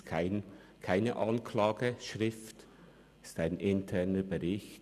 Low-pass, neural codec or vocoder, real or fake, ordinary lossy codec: 14.4 kHz; none; real; none